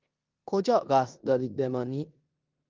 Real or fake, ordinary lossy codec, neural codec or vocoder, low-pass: fake; Opus, 24 kbps; codec, 16 kHz in and 24 kHz out, 0.9 kbps, LongCat-Audio-Codec, four codebook decoder; 7.2 kHz